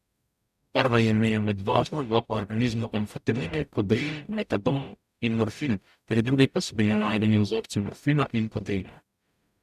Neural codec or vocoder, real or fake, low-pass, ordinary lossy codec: codec, 44.1 kHz, 0.9 kbps, DAC; fake; 14.4 kHz; none